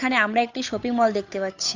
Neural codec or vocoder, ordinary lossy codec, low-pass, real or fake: codec, 16 kHz, 8 kbps, FunCodec, trained on Chinese and English, 25 frames a second; AAC, 48 kbps; 7.2 kHz; fake